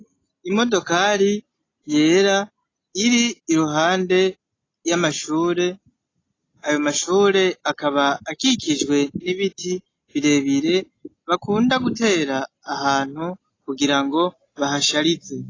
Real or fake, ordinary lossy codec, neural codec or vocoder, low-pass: real; AAC, 32 kbps; none; 7.2 kHz